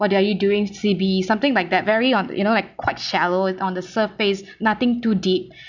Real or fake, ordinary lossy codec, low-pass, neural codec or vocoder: real; none; 7.2 kHz; none